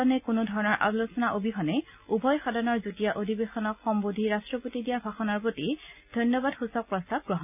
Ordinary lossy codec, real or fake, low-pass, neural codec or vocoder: none; real; 3.6 kHz; none